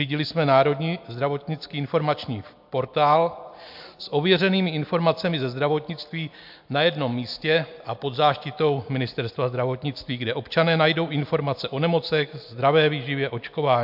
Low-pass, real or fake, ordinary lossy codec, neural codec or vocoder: 5.4 kHz; real; MP3, 48 kbps; none